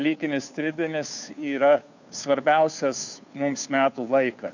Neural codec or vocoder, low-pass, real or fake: codec, 16 kHz, 2 kbps, FunCodec, trained on Chinese and English, 25 frames a second; 7.2 kHz; fake